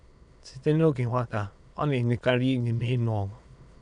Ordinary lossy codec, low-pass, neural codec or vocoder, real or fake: none; 9.9 kHz; autoencoder, 22.05 kHz, a latent of 192 numbers a frame, VITS, trained on many speakers; fake